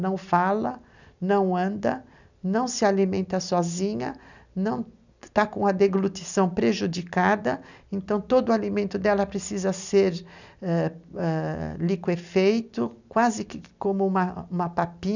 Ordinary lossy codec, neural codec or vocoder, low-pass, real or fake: none; none; 7.2 kHz; real